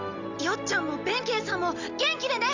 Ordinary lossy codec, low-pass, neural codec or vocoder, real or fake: Opus, 64 kbps; 7.2 kHz; none; real